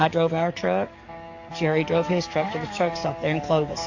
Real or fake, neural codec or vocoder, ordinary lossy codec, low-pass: fake; codec, 44.1 kHz, 7.8 kbps, DAC; AAC, 48 kbps; 7.2 kHz